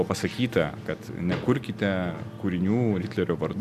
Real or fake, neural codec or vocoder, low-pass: real; none; 14.4 kHz